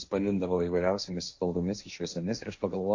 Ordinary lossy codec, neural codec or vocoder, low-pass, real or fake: AAC, 48 kbps; codec, 16 kHz, 1.1 kbps, Voila-Tokenizer; 7.2 kHz; fake